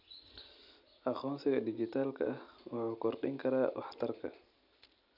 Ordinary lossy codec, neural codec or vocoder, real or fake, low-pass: none; none; real; 5.4 kHz